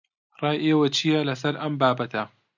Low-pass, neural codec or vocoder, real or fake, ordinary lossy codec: 7.2 kHz; none; real; MP3, 64 kbps